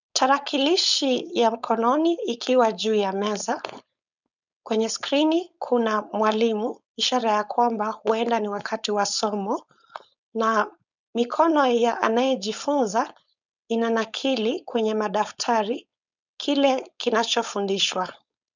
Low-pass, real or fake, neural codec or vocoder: 7.2 kHz; fake; codec, 16 kHz, 4.8 kbps, FACodec